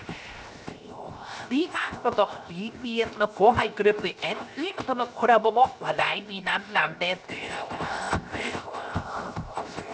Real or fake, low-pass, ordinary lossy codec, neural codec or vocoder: fake; none; none; codec, 16 kHz, 0.7 kbps, FocalCodec